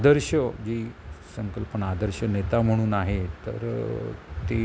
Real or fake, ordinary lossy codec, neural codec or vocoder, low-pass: real; none; none; none